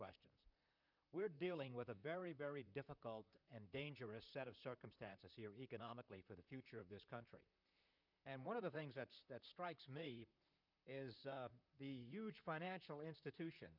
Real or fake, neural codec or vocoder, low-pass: fake; vocoder, 44.1 kHz, 128 mel bands, Pupu-Vocoder; 5.4 kHz